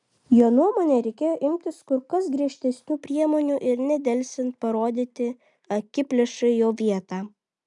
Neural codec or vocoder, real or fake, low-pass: none; real; 10.8 kHz